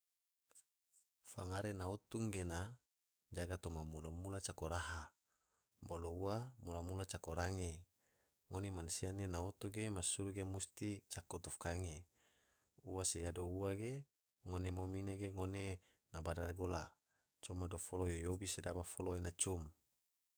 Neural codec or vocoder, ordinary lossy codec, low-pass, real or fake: codec, 44.1 kHz, 7.8 kbps, DAC; none; none; fake